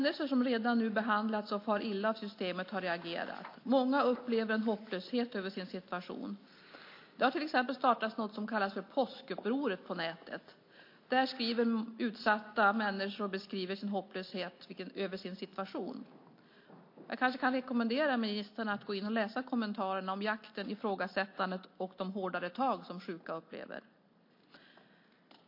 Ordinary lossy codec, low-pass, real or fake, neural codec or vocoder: MP3, 32 kbps; 5.4 kHz; real; none